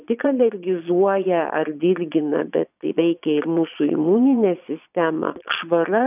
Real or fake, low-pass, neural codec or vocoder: fake; 3.6 kHz; vocoder, 22.05 kHz, 80 mel bands, Vocos